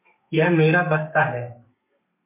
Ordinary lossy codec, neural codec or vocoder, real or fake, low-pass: MP3, 32 kbps; codec, 32 kHz, 1.9 kbps, SNAC; fake; 3.6 kHz